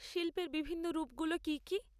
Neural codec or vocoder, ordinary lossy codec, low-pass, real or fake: none; none; 14.4 kHz; real